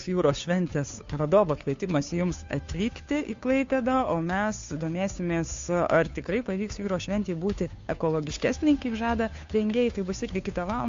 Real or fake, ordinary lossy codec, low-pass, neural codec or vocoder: fake; MP3, 48 kbps; 7.2 kHz; codec, 16 kHz, 2 kbps, FunCodec, trained on Chinese and English, 25 frames a second